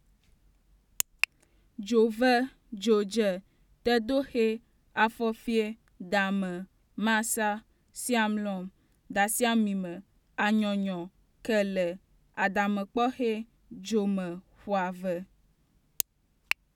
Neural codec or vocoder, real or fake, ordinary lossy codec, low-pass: none; real; none; 19.8 kHz